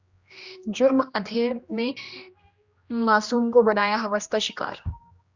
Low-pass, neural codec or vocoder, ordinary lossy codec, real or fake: 7.2 kHz; codec, 16 kHz, 1 kbps, X-Codec, HuBERT features, trained on general audio; Opus, 64 kbps; fake